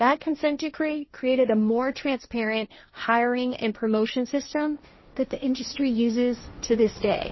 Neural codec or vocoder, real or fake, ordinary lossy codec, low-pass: codec, 16 kHz, 1.1 kbps, Voila-Tokenizer; fake; MP3, 24 kbps; 7.2 kHz